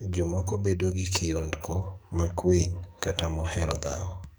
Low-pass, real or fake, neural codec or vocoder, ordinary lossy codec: none; fake; codec, 44.1 kHz, 2.6 kbps, SNAC; none